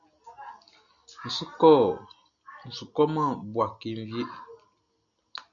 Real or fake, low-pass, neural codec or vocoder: real; 7.2 kHz; none